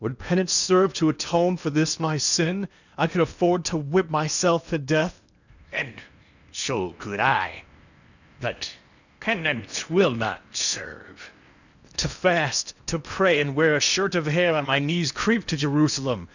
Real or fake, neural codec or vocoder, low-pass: fake; codec, 16 kHz in and 24 kHz out, 0.8 kbps, FocalCodec, streaming, 65536 codes; 7.2 kHz